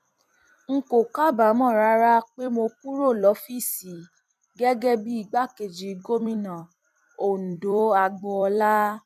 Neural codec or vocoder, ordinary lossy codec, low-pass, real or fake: vocoder, 44.1 kHz, 128 mel bands every 256 samples, BigVGAN v2; none; 14.4 kHz; fake